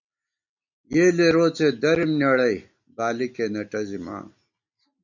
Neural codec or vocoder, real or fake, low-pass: none; real; 7.2 kHz